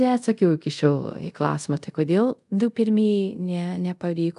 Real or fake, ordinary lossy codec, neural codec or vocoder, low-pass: fake; MP3, 96 kbps; codec, 24 kHz, 0.5 kbps, DualCodec; 10.8 kHz